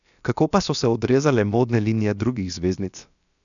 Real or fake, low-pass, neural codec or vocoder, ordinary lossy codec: fake; 7.2 kHz; codec, 16 kHz, about 1 kbps, DyCAST, with the encoder's durations; none